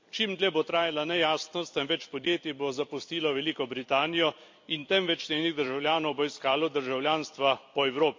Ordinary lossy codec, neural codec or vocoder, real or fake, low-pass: MP3, 48 kbps; vocoder, 44.1 kHz, 128 mel bands every 256 samples, BigVGAN v2; fake; 7.2 kHz